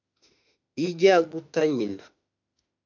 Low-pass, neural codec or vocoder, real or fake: 7.2 kHz; autoencoder, 48 kHz, 32 numbers a frame, DAC-VAE, trained on Japanese speech; fake